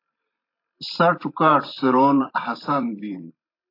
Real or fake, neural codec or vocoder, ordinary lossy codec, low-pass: fake; vocoder, 44.1 kHz, 128 mel bands every 512 samples, BigVGAN v2; AAC, 32 kbps; 5.4 kHz